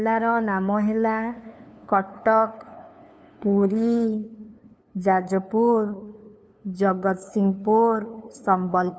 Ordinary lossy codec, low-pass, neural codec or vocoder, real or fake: none; none; codec, 16 kHz, 2 kbps, FunCodec, trained on LibriTTS, 25 frames a second; fake